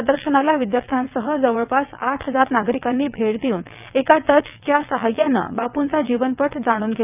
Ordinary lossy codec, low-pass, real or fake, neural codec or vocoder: none; 3.6 kHz; fake; vocoder, 22.05 kHz, 80 mel bands, WaveNeXt